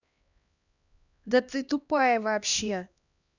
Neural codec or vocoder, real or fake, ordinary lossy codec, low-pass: codec, 16 kHz, 1 kbps, X-Codec, HuBERT features, trained on LibriSpeech; fake; none; 7.2 kHz